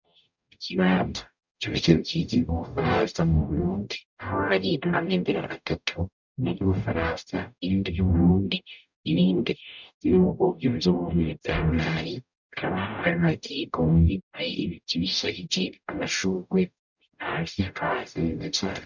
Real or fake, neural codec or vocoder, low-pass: fake; codec, 44.1 kHz, 0.9 kbps, DAC; 7.2 kHz